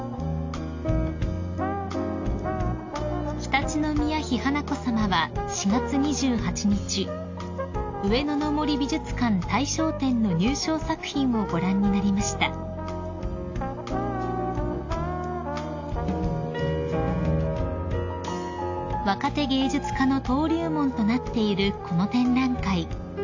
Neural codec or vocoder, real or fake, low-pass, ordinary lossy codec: none; real; 7.2 kHz; AAC, 48 kbps